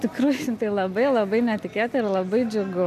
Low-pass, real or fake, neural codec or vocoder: 14.4 kHz; real; none